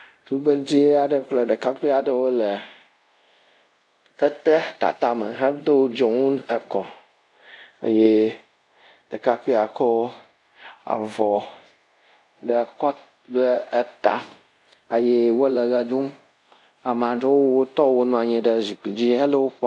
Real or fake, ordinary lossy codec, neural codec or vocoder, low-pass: fake; AAC, 64 kbps; codec, 24 kHz, 0.5 kbps, DualCodec; 10.8 kHz